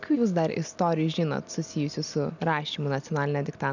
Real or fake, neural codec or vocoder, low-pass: real; none; 7.2 kHz